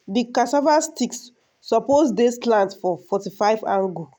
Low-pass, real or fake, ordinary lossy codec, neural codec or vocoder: none; real; none; none